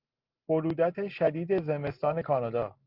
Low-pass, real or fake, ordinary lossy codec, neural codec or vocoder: 5.4 kHz; real; Opus, 24 kbps; none